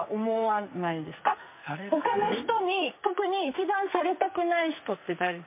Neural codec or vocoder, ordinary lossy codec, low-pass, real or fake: codec, 44.1 kHz, 2.6 kbps, SNAC; MP3, 24 kbps; 3.6 kHz; fake